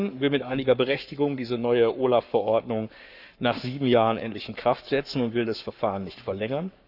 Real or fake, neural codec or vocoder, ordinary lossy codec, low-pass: fake; codec, 44.1 kHz, 7.8 kbps, Pupu-Codec; none; 5.4 kHz